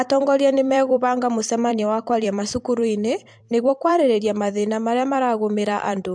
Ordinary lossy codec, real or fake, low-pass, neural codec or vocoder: MP3, 64 kbps; fake; 9.9 kHz; vocoder, 44.1 kHz, 128 mel bands every 256 samples, BigVGAN v2